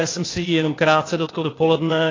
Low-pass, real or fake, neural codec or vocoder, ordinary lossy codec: 7.2 kHz; fake; codec, 16 kHz, 0.8 kbps, ZipCodec; AAC, 32 kbps